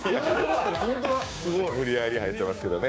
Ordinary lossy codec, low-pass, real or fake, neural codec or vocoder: none; none; fake; codec, 16 kHz, 6 kbps, DAC